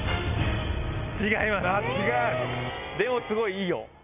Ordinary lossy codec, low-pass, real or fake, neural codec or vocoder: none; 3.6 kHz; real; none